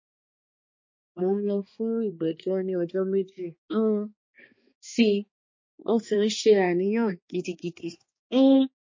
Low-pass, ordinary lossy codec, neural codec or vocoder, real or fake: 7.2 kHz; MP3, 32 kbps; codec, 16 kHz, 2 kbps, X-Codec, HuBERT features, trained on balanced general audio; fake